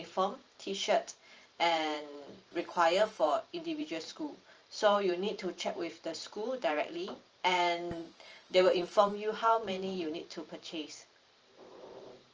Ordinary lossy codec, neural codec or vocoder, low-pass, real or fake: Opus, 24 kbps; vocoder, 44.1 kHz, 128 mel bands every 512 samples, BigVGAN v2; 7.2 kHz; fake